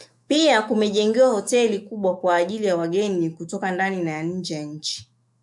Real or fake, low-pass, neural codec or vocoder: fake; 10.8 kHz; autoencoder, 48 kHz, 128 numbers a frame, DAC-VAE, trained on Japanese speech